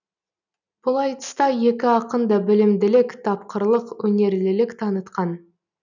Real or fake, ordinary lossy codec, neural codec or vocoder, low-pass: real; none; none; 7.2 kHz